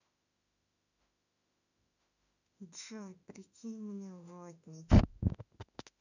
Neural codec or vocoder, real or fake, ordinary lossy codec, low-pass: autoencoder, 48 kHz, 32 numbers a frame, DAC-VAE, trained on Japanese speech; fake; none; 7.2 kHz